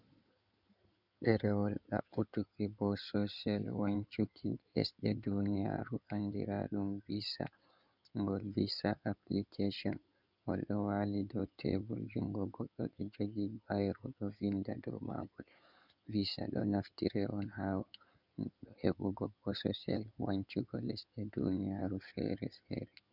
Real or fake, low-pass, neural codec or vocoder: fake; 5.4 kHz; codec, 16 kHz in and 24 kHz out, 2.2 kbps, FireRedTTS-2 codec